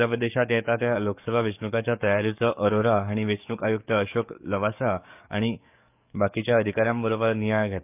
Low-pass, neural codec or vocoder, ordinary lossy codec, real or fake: 3.6 kHz; codec, 44.1 kHz, 7.8 kbps, DAC; none; fake